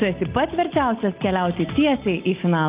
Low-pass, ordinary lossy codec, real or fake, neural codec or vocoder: 3.6 kHz; Opus, 32 kbps; real; none